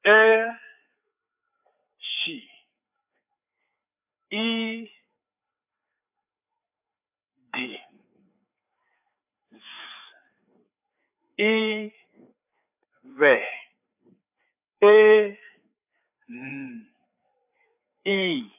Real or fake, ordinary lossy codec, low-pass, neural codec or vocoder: fake; AAC, 32 kbps; 3.6 kHz; codec, 16 kHz, 4 kbps, FreqCodec, larger model